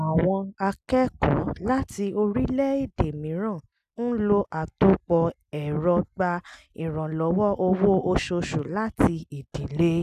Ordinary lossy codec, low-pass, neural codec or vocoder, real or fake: none; 14.4 kHz; none; real